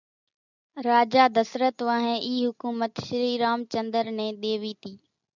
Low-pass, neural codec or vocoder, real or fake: 7.2 kHz; none; real